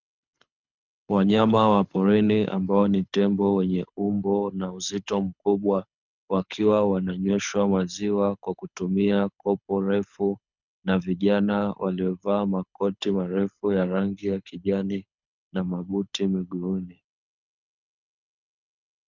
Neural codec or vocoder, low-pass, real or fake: codec, 24 kHz, 6 kbps, HILCodec; 7.2 kHz; fake